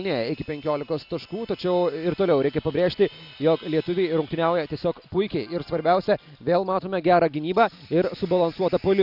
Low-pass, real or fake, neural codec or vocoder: 5.4 kHz; real; none